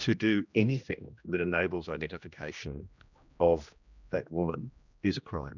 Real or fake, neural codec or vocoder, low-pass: fake; codec, 16 kHz, 1 kbps, X-Codec, HuBERT features, trained on general audio; 7.2 kHz